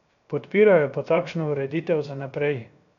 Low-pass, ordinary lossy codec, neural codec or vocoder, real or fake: 7.2 kHz; none; codec, 16 kHz, 0.7 kbps, FocalCodec; fake